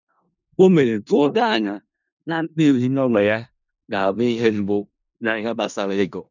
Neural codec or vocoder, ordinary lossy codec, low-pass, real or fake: codec, 16 kHz in and 24 kHz out, 0.4 kbps, LongCat-Audio-Codec, four codebook decoder; none; 7.2 kHz; fake